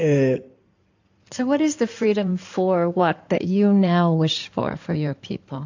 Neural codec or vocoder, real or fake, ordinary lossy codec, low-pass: codec, 16 kHz in and 24 kHz out, 2.2 kbps, FireRedTTS-2 codec; fake; AAC, 48 kbps; 7.2 kHz